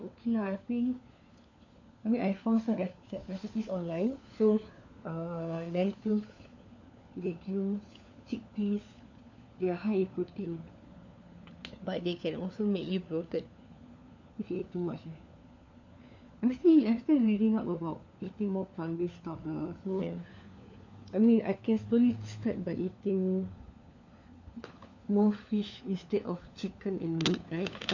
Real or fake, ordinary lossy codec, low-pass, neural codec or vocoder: fake; none; 7.2 kHz; codec, 16 kHz, 4 kbps, FunCodec, trained on LibriTTS, 50 frames a second